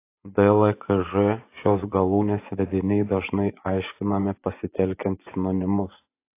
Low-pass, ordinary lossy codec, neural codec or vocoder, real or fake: 3.6 kHz; AAC, 24 kbps; vocoder, 24 kHz, 100 mel bands, Vocos; fake